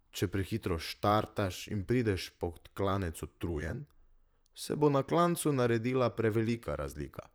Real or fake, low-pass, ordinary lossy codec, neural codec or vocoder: fake; none; none; vocoder, 44.1 kHz, 128 mel bands, Pupu-Vocoder